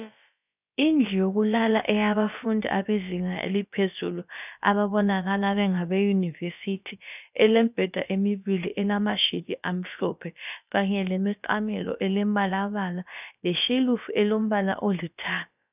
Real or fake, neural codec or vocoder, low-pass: fake; codec, 16 kHz, about 1 kbps, DyCAST, with the encoder's durations; 3.6 kHz